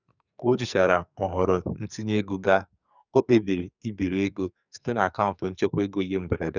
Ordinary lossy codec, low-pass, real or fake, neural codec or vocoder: none; 7.2 kHz; fake; codec, 44.1 kHz, 2.6 kbps, SNAC